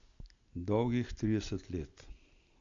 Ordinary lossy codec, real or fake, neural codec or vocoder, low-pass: AAC, 64 kbps; real; none; 7.2 kHz